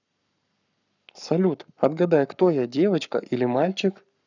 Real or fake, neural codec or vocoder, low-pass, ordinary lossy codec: fake; codec, 44.1 kHz, 7.8 kbps, Pupu-Codec; 7.2 kHz; none